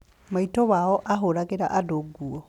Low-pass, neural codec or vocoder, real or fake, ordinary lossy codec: 19.8 kHz; none; real; none